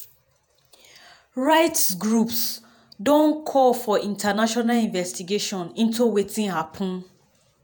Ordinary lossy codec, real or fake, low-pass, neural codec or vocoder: none; fake; none; vocoder, 48 kHz, 128 mel bands, Vocos